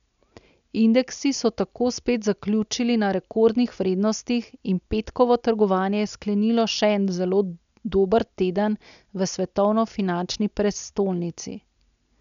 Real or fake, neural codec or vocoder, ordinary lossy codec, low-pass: real; none; none; 7.2 kHz